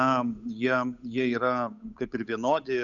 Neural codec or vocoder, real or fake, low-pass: codec, 16 kHz, 8 kbps, FunCodec, trained on Chinese and English, 25 frames a second; fake; 7.2 kHz